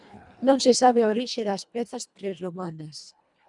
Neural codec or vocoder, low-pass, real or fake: codec, 24 kHz, 1.5 kbps, HILCodec; 10.8 kHz; fake